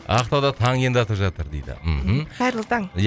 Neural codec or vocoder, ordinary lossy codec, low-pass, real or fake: none; none; none; real